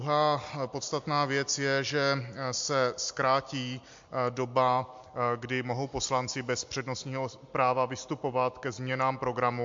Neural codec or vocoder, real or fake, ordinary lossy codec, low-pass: none; real; MP3, 48 kbps; 7.2 kHz